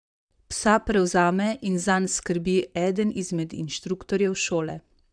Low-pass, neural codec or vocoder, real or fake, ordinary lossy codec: 9.9 kHz; vocoder, 44.1 kHz, 128 mel bands, Pupu-Vocoder; fake; none